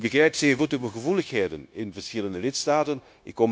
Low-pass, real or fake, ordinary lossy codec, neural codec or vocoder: none; fake; none; codec, 16 kHz, 0.9 kbps, LongCat-Audio-Codec